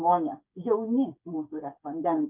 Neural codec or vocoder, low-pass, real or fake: codec, 16 kHz, 8 kbps, FreqCodec, smaller model; 3.6 kHz; fake